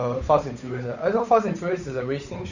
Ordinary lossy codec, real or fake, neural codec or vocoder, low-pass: none; fake; codec, 16 kHz, 8 kbps, FunCodec, trained on Chinese and English, 25 frames a second; 7.2 kHz